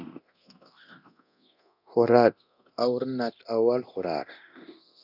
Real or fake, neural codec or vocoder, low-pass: fake; codec, 24 kHz, 0.9 kbps, DualCodec; 5.4 kHz